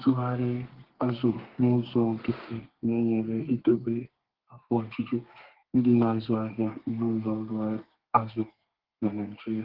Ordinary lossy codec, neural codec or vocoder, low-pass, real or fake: Opus, 16 kbps; codec, 32 kHz, 1.9 kbps, SNAC; 5.4 kHz; fake